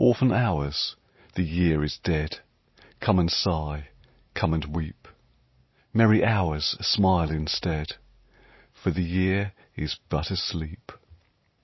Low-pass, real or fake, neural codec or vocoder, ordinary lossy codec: 7.2 kHz; real; none; MP3, 24 kbps